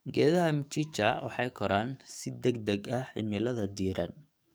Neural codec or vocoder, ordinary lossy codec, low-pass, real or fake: codec, 44.1 kHz, 7.8 kbps, DAC; none; none; fake